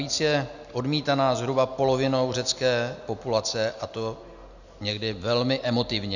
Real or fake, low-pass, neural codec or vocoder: real; 7.2 kHz; none